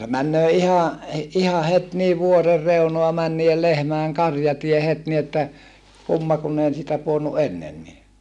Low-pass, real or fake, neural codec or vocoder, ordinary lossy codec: none; real; none; none